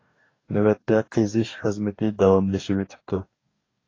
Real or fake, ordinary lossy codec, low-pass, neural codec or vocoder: fake; AAC, 32 kbps; 7.2 kHz; codec, 44.1 kHz, 2.6 kbps, DAC